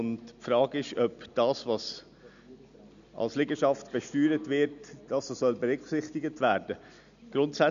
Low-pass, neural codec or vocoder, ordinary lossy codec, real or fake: 7.2 kHz; none; none; real